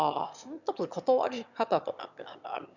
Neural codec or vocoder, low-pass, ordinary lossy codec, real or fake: autoencoder, 22.05 kHz, a latent of 192 numbers a frame, VITS, trained on one speaker; 7.2 kHz; none; fake